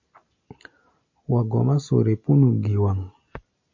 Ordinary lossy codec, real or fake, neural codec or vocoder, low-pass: MP3, 48 kbps; real; none; 7.2 kHz